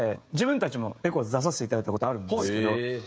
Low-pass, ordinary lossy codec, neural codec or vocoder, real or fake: none; none; codec, 16 kHz, 16 kbps, FreqCodec, smaller model; fake